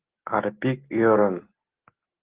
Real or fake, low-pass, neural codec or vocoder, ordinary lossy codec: real; 3.6 kHz; none; Opus, 16 kbps